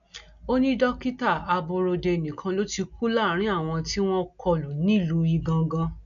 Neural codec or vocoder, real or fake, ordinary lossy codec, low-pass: none; real; none; 7.2 kHz